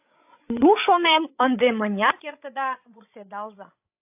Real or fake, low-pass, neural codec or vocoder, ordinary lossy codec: fake; 3.6 kHz; codec, 16 kHz, 16 kbps, FreqCodec, larger model; AAC, 32 kbps